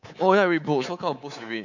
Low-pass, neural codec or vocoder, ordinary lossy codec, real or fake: 7.2 kHz; codec, 24 kHz, 3.1 kbps, DualCodec; none; fake